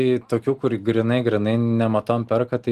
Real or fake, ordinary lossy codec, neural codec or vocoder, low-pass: real; Opus, 24 kbps; none; 14.4 kHz